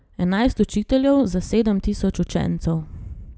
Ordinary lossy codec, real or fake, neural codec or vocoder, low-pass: none; real; none; none